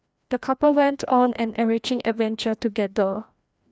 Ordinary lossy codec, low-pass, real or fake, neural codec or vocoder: none; none; fake; codec, 16 kHz, 1 kbps, FreqCodec, larger model